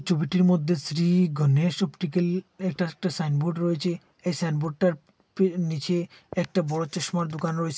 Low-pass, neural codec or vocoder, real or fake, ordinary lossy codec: none; none; real; none